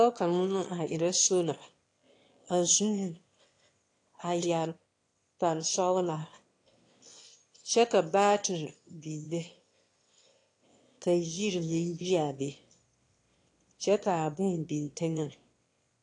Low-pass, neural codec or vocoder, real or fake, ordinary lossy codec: 9.9 kHz; autoencoder, 22.05 kHz, a latent of 192 numbers a frame, VITS, trained on one speaker; fake; AAC, 48 kbps